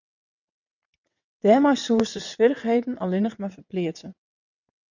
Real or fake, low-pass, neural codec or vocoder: fake; 7.2 kHz; vocoder, 22.05 kHz, 80 mel bands, WaveNeXt